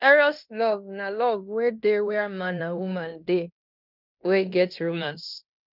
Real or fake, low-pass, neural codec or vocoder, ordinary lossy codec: fake; 5.4 kHz; codec, 16 kHz in and 24 kHz out, 0.9 kbps, LongCat-Audio-Codec, fine tuned four codebook decoder; none